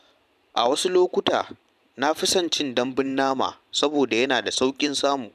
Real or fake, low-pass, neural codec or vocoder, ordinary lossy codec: fake; 14.4 kHz; vocoder, 44.1 kHz, 128 mel bands every 512 samples, BigVGAN v2; none